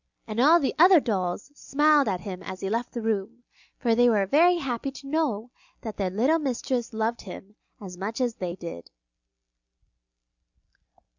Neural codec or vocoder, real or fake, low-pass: none; real; 7.2 kHz